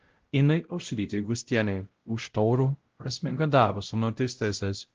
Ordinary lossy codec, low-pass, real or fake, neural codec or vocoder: Opus, 16 kbps; 7.2 kHz; fake; codec, 16 kHz, 0.5 kbps, X-Codec, WavLM features, trained on Multilingual LibriSpeech